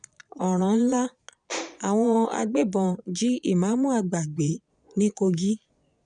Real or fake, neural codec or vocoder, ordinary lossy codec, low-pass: fake; vocoder, 22.05 kHz, 80 mel bands, Vocos; none; 9.9 kHz